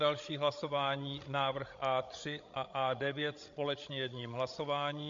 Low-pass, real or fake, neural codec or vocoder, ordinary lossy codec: 7.2 kHz; fake; codec, 16 kHz, 16 kbps, FreqCodec, larger model; MP3, 48 kbps